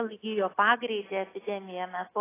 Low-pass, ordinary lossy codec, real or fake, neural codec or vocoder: 3.6 kHz; AAC, 16 kbps; real; none